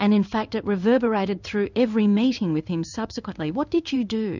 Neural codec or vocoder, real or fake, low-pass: codec, 16 kHz in and 24 kHz out, 1 kbps, XY-Tokenizer; fake; 7.2 kHz